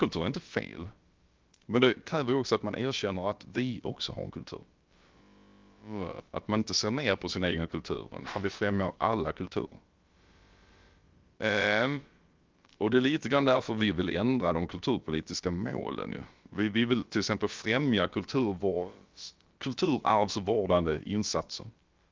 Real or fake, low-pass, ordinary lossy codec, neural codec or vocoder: fake; 7.2 kHz; Opus, 32 kbps; codec, 16 kHz, about 1 kbps, DyCAST, with the encoder's durations